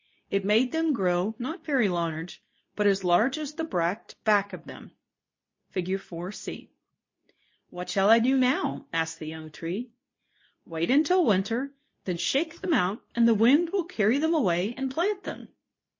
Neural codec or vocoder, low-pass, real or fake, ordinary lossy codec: codec, 24 kHz, 0.9 kbps, WavTokenizer, medium speech release version 2; 7.2 kHz; fake; MP3, 32 kbps